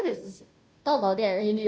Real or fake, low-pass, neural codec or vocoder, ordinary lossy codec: fake; none; codec, 16 kHz, 0.5 kbps, FunCodec, trained on Chinese and English, 25 frames a second; none